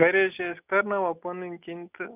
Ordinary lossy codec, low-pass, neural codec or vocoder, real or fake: none; 3.6 kHz; none; real